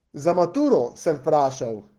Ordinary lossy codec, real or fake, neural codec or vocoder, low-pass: Opus, 16 kbps; fake; codec, 44.1 kHz, 7.8 kbps, Pupu-Codec; 19.8 kHz